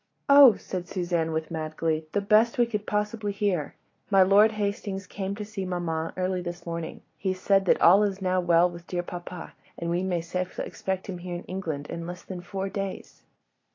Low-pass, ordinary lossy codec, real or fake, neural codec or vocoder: 7.2 kHz; AAC, 32 kbps; real; none